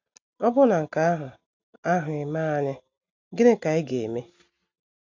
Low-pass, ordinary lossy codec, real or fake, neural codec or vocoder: 7.2 kHz; AAC, 48 kbps; real; none